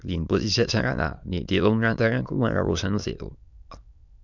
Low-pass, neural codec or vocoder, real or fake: 7.2 kHz; autoencoder, 22.05 kHz, a latent of 192 numbers a frame, VITS, trained on many speakers; fake